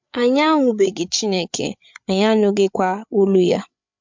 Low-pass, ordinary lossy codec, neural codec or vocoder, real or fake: 7.2 kHz; MP3, 64 kbps; codec, 16 kHz, 8 kbps, FreqCodec, larger model; fake